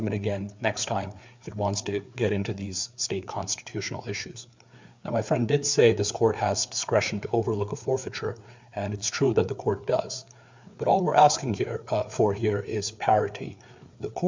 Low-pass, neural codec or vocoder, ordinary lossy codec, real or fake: 7.2 kHz; codec, 16 kHz, 4 kbps, FreqCodec, larger model; MP3, 64 kbps; fake